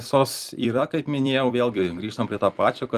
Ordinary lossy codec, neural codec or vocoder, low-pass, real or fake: Opus, 32 kbps; vocoder, 44.1 kHz, 128 mel bands every 256 samples, BigVGAN v2; 14.4 kHz; fake